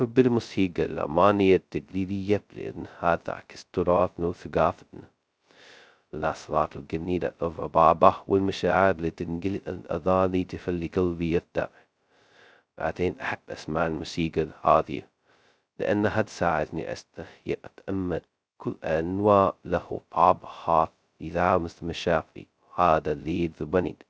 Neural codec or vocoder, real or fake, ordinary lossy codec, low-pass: codec, 16 kHz, 0.2 kbps, FocalCodec; fake; none; none